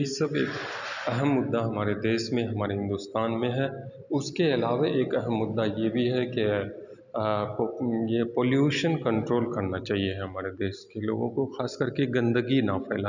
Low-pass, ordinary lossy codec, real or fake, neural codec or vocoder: 7.2 kHz; none; real; none